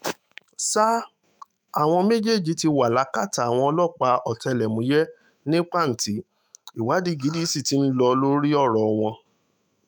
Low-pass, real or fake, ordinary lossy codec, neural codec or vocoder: none; fake; none; autoencoder, 48 kHz, 128 numbers a frame, DAC-VAE, trained on Japanese speech